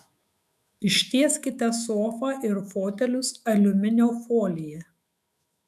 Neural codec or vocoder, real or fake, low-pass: autoencoder, 48 kHz, 128 numbers a frame, DAC-VAE, trained on Japanese speech; fake; 14.4 kHz